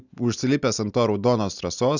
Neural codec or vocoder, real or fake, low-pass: none; real; 7.2 kHz